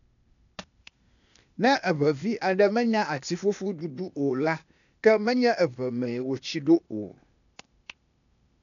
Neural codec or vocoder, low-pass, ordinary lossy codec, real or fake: codec, 16 kHz, 0.8 kbps, ZipCodec; 7.2 kHz; none; fake